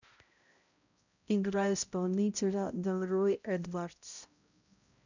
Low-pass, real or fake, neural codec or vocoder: 7.2 kHz; fake; codec, 16 kHz, 0.5 kbps, X-Codec, HuBERT features, trained on balanced general audio